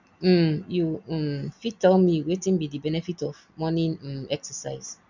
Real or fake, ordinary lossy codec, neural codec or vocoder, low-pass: real; none; none; 7.2 kHz